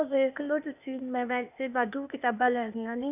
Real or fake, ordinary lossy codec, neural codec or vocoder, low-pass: fake; none; codec, 16 kHz, 0.8 kbps, ZipCodec; 3.6 kHz